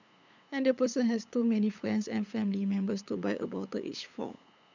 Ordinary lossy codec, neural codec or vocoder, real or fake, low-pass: none; codec, 16 kHz, 8 kbps, FunCodec, trained on LibriTTS, 25 frames a second; fake; 7.2 kHz